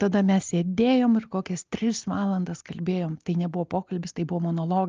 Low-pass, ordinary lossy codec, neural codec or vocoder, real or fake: 7.2 kHz; Opus, 24 kbps; none; real